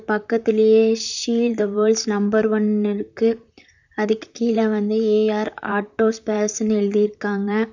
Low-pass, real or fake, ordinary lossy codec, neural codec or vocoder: 7.2 kHz; fake; none; vocoder, 44.1 kHz, 128 mel bands, Pupu-Vocoder